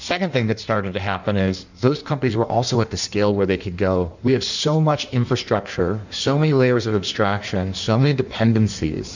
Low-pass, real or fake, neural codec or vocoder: 7.2 kHz; fake; codec, 16 kHz in and 24 kHz out, 1.1 kbps, FireRedTTS-2 codec